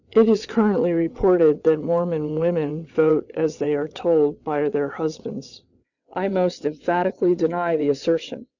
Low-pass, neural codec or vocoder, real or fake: 7.2 kHz; vocoder, 22.05 kHz, 80 mel bands, WaveNeXt; fake